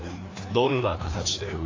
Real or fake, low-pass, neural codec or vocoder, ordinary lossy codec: fake; 7.2 kHz; codec, 16 kHz, 2 kbps, FreqCodec, larger model; AAC, 32 kbps